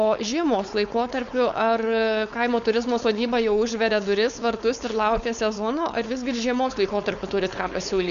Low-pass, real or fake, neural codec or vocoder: 7.2 kHz; fake; codec, 16 kHz, 4.8 kbps, FACodec